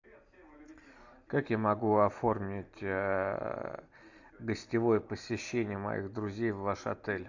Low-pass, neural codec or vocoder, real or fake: 7.2 kHz; none; real